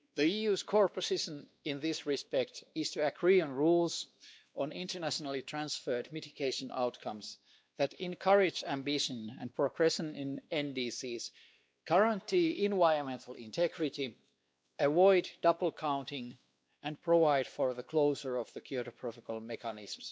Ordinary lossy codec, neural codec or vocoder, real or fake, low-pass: none; codec, 16 kHz, 2 kbps, X-Codec, WavLM features, trained on Multilingual LibriSpeech; fake; none